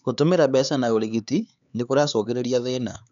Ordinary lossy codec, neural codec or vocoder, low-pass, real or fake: none; codec, 16 kHz, 4 kbps, X-Codec, HuBERT features, trained on LibriSpeech; 7.2 kHz; fake